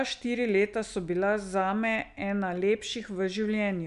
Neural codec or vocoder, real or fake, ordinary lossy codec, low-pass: none; real; none; 10.8 kHz